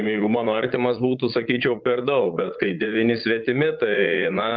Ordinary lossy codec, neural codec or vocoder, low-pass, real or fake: Opus, 24 kbps; vocoder, 22.05 kHz, 80 mel bands, Vocos; 7.2 kHz; fake